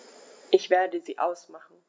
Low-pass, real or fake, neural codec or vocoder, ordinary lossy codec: none; real; none; none